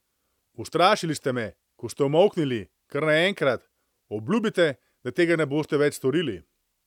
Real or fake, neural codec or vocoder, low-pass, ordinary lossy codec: real; none; 19.8 kHz; none